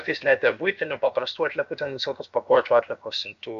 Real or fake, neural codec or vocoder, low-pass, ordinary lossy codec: fake; codec, 16 kHz, about 1 kbps, DyCAST, with the encoder's durations; 7.2 kHz; MP3, 64 kbps